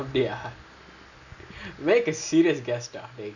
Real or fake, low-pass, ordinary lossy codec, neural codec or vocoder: real; 7.2 kHz; none; none